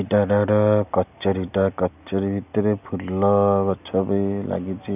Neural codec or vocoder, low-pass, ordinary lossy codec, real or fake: none; 3.6 kHz; none; real